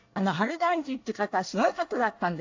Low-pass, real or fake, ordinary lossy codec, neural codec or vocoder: 7.2 kHz; fake; AAC, 48 kbps; codec, 24 kHz, 1 kbps, SNAC